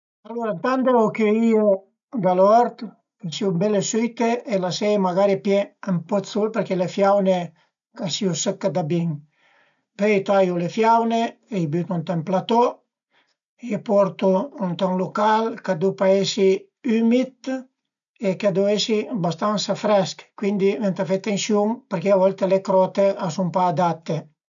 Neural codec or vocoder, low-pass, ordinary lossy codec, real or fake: none; 7.2 kHz; none; real